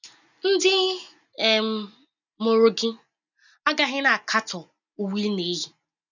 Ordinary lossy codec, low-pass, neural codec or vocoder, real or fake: none; 7.2 kHz; none; real